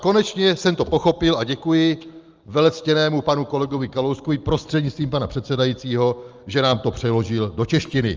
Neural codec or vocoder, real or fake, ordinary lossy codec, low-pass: none; real; Opus, 24 kbps; 7.2 kHz